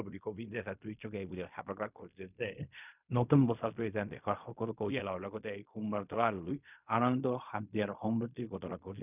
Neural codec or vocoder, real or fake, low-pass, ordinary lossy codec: codec, 16 kHz in and 24 kHz out, 0.4 kbps, LongCat-Audio-Codec, fine tuned four codebook decoder; fake; 3.6 kHz; none